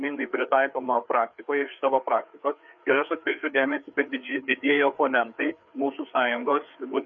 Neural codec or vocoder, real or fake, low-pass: codec, 16 kHz, 4 kbps, FreqCodec, larger model; fake; 7.2 kHz